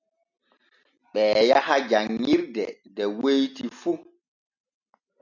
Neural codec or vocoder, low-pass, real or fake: none; 7.2 kHz; real